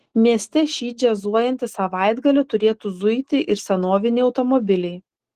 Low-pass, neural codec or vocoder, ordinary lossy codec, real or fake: 14.4 kHz; autoencoder, 48 kHz, 128 numbers a frame, DAC-VAE, trained on Japanese speech; Opus, 16 kbps; fake